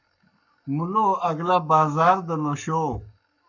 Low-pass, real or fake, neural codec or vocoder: 7.2 kHz; fake; codec, 44.1 kHz, 7.8 kbps, Pupu-Codec